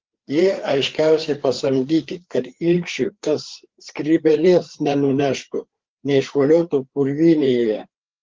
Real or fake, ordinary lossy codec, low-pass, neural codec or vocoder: fake; Opus, 16 kbps; 7.2 kHz; codec, 16 kHz, 4 kbps, FreqCodec, larger model